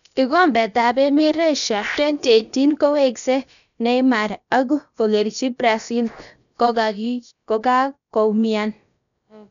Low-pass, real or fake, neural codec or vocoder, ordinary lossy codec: 7.2 kHz; fake; codec, 16 kHz, about 1 kbps, DyCAST, with the encoder's durations; none